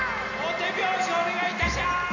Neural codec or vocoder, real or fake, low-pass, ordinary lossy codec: none; real; 7.2 kHz; none